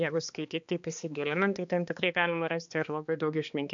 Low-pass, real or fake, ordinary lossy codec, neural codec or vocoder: 7.2 kHz; fake; MP3, 64 kbps; codec, 16 kHz, 2 kbps, X-Codec, HuBERT features, trained on balanced general audio